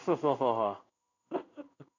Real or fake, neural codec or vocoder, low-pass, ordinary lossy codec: fake; codec, 16 kHz in and 24 kHz out, 1 kbps, XY-Tokenizer; 7.2 kHz; none